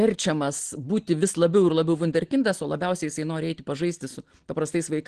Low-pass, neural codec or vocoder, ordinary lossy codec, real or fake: 9.9 kHz; none; Opus, 16 kbps; real